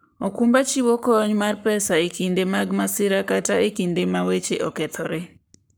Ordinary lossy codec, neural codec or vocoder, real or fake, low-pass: none; codec, 44.1 kHz, 7.8 kbps, Pupu-Codec; fake; none